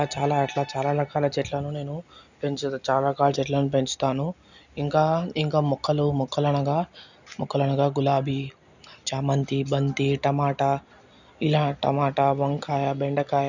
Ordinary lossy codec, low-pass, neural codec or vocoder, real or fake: none; 7.2 kHz; none; real